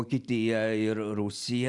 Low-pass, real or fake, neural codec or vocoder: 10.8 kHz; real; none